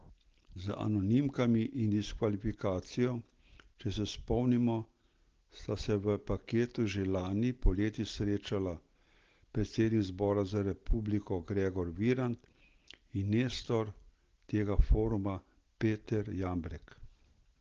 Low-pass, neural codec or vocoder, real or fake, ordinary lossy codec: 7.2 kHz; none; real; Opus, 16 kbps